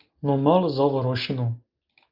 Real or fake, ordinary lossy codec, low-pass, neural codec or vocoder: real; Opus, 32 kbps; 5.4 kHz; none